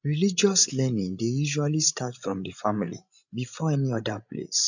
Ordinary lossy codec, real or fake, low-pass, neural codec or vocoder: none; fake; 7.2 kHz; codec, 16 kHz, 16 kbps, FreqCodec, larger model